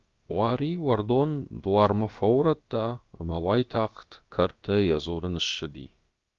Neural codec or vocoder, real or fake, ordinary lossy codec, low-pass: codec, 16 kHz, about 1 kbps, DyCAST, with the encoder's durations; fake; Opus, 32 kbps; 7.2 kHz